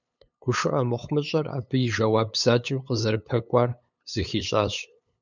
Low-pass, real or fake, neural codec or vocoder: 7.2 kHz; fake; codec, 16 kHz, 8 kbps, FunCodec, trained on LibriTTS, 25 frames a second